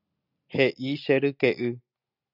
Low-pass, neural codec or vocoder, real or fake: 5.4 kHz; none; real